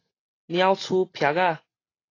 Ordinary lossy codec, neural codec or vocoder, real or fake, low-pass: AAC, 32 kbps; none; real; 7.2 kHz